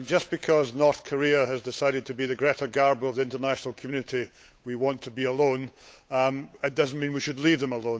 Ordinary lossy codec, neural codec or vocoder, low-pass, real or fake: none; codec, 16 kHz, 8 kbps, FunCodec, trained on Chinese and English, 25 frames a second; none; fake